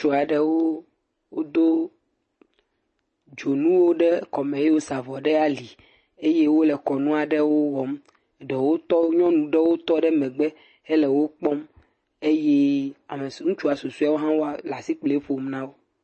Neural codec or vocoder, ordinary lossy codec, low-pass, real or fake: none; MP3, 32 kbps; 10.8 kHz; real